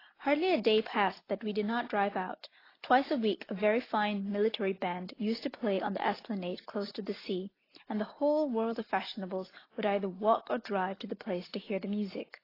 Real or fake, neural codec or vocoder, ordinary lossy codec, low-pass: real; none; AAC, 24 kbps; 5.4 kHz